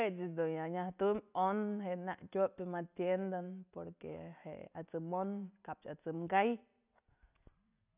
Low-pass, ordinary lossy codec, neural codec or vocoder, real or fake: 3.6 kHz; none; none; real